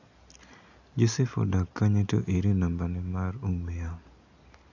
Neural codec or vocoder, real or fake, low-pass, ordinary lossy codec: none; real; 7.2 kHz; none